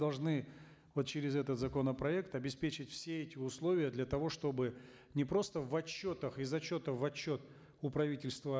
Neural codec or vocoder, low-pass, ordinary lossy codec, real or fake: none; none; none; real